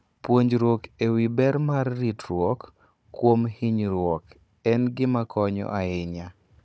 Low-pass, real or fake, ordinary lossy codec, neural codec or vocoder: none; fake; none; codec, 16 kHz, 16 kbps, FunCodec, trained on Chinese and English, 50 frames a second